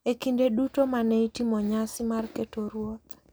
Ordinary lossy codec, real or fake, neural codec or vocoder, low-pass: none; real; none; none